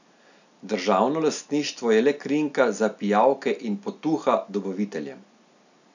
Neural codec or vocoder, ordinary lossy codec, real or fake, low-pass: none; none; real; 7.2 kHz